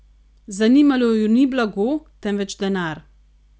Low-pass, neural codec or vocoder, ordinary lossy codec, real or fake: none; none; none; real